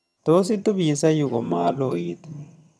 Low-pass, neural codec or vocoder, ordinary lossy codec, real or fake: none; vocoder, 22.05 kHz, 80 mel bands, HiFi-GAN; none; fake